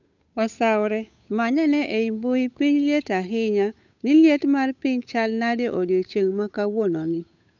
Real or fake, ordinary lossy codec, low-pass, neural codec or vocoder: fake; none; 7.2 kHz; codec, 16 kHz, 8 kbps, FunCodec, trained on Chinese and English, 25 frames a second